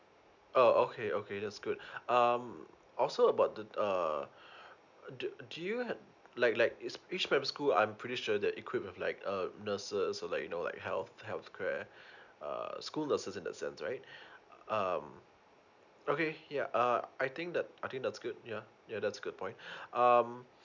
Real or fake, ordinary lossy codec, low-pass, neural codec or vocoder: real; none; 7.2 kHz; none